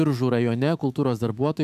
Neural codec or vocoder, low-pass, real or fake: autoencoder, 48 kHz, 128 numbers a frame, DAC-VAE, trained on Japanese speech; 14.4 kHz; fake